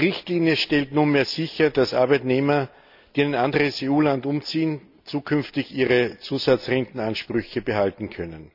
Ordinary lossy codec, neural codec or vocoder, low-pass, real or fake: none; none; 5.4 kHz; real